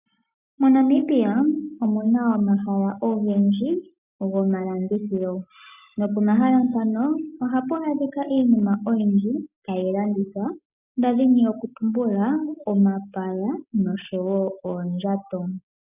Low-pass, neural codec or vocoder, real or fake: 3.6 kHz; none; real